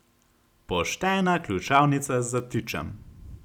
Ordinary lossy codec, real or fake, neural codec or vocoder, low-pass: none; real; none; 19.8 kHz